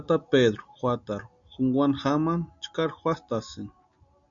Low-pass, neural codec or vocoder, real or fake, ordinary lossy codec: 7.2 kHz; none; real; MP3, 96 kbps